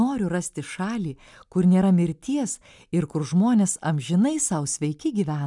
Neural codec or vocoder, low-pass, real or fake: none; 10.8 kHz; real